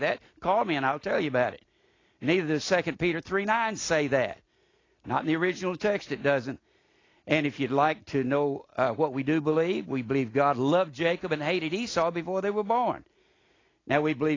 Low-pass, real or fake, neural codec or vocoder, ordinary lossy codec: 7.2 kHz; real; none; AAC, 32 kbps